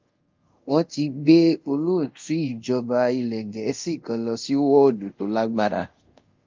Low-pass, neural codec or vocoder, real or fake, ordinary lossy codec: 7.2 kHz; codec, 24 kHz, 0.5 kbps, DualCodec; fake; Opus, 24 kbps